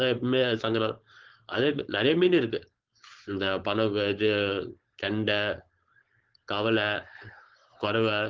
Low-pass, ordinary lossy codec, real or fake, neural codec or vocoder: 7.2 kHz; Opus, 16 kbps; fake; codec, 16 kHz, 4.8 kbps, FACodec